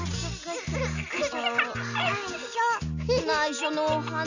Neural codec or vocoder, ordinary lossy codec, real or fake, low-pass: none; none; real; 7.2 kHz